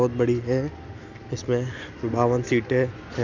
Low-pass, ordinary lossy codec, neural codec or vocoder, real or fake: 7.2 kHz; none; none; real